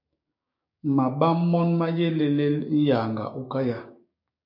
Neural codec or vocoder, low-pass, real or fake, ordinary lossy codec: codec, 16 kHz, 6 kbps, DAC; 5.4 kHz; fake; MP3, 32 kbps